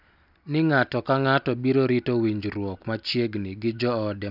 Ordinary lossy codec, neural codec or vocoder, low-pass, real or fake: none; none; 5.4 kHz; real